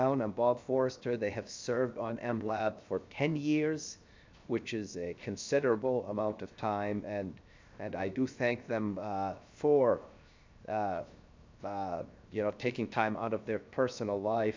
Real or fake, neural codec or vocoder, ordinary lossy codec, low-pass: fake; codec, 16 kHz, 0.7 kbps, FocalCodec; MP3, 64 kbps; 7.2 kHz